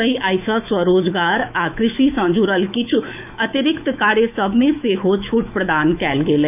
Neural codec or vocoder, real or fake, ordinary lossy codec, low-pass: autoencoder, 48 kHz, 128 numbers a frame, DAC-VAE, trained on Japanese speech; fake; none; 3.6 kHz